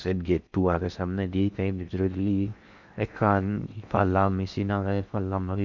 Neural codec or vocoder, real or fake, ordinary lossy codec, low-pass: codec, 16 kHz in and 24 kHz out, 0.6 kbps, FocalCodec, streaming, 4096 codes; fake; none; 7.2 kHz